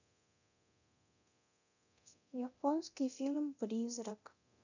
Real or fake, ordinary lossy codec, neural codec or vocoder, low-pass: fake; none; codec, 24 kHz, 0.9 kbps, DualCodec; 7.2 kHz